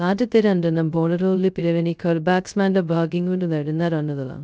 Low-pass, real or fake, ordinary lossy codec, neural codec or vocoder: none; fake; none; codec, 16 kHz, 0.2 kbps, FocalCodec